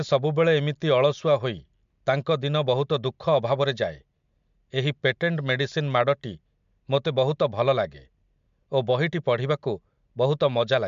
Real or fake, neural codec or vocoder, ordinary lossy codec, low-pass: real; none; MP3, 64 kbps; 7.2 kHz